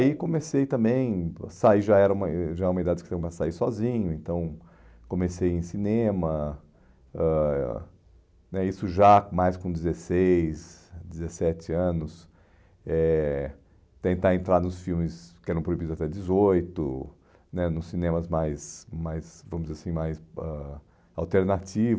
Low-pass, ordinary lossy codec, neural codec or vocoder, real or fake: none; none; none; real